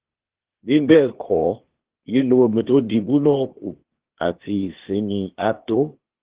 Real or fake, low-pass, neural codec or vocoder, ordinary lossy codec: fake; 3.6 kHz; codec, 16 kHz, 0.8 kbps, ZipCodec; Opus, 16 kbps